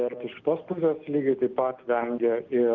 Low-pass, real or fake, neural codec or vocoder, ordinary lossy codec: 7.2 kHz; real; none; Opus, 32 kbps